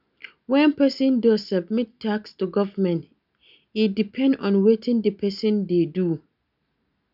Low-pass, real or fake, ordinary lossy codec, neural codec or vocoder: 5.4 kHz; real; none; none